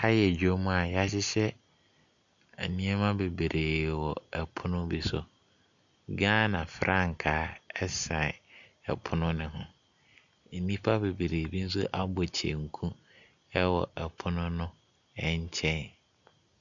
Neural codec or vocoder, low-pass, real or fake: none; 7.2 kHz; real